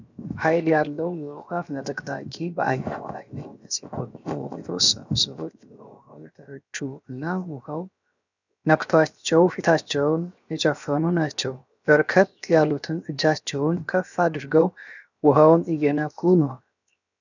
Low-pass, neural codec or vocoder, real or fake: 7.2 kHz; codec, 16 kHz, 0.7 kbps, FocalCodec; fake